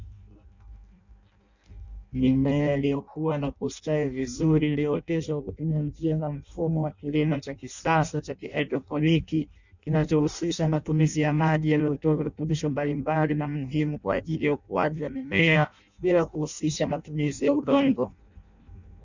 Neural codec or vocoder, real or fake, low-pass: codec, 16 kHz in and 24 kHz out, 0.6 kbps, FireRedTTS-2 codec; fake; 7.2 kHz